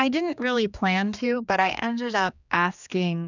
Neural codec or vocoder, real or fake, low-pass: codec, 16 kHz, 2 kbps, X-Codec, HuBERT features, trained on general audio; fake; 7.2 kHz